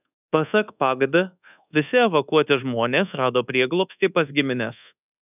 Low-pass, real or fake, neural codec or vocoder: 3.6 kHz; fake; codec, 24 kHz, 1.2 kbps, DualCodec